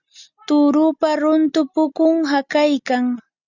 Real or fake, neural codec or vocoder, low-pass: real; none; 7.2 kHz